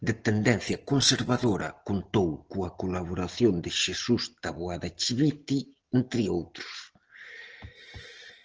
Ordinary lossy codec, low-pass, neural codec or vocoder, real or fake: Opus, 16 kbps; 7.2 kHz; none; real